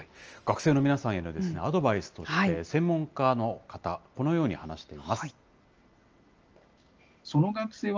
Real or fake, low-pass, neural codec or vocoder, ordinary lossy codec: real; 7.2 kHz; none; Opus, 24 kbps